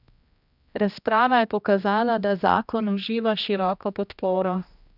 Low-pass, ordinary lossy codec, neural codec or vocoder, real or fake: 5.4 kHz; none; codec, 16 kHz, 1 kbps, X-Codec, HuBERT features, trained on general audio; fake